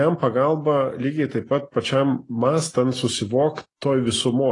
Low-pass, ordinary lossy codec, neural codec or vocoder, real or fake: 10.8 kHz; AAC, 32 kbps; none; real